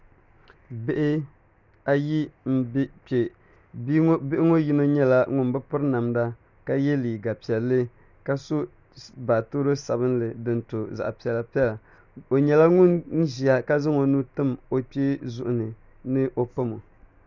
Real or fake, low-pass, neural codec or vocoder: real; 7.2 kHz; none